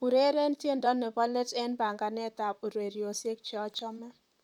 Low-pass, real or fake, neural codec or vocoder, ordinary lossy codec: 19.8 kHz; fake; vocoder, 44.1 kHz, 128 mel bands, Pupu-Vocoder; none